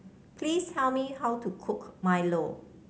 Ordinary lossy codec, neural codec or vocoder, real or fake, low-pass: none; none; real; none